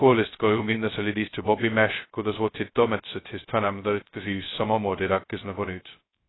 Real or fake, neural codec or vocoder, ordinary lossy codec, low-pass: fake; codec, 16 kHz, 0.2 kbps, FocalCodec; AAC, 16 kbps; 7.2 kHz